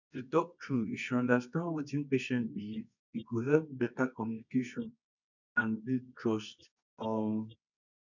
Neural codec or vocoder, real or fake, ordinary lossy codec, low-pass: codec, 24 kHz, 0.9 kbps, WavTokenizer, medium music audio release; fake; none; 7.2 kHz